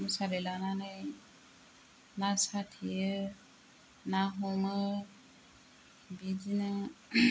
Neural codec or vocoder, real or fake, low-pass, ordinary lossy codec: none; real; none; none